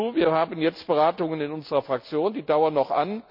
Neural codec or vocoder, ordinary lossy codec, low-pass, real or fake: none; none; 5.4 kHz; real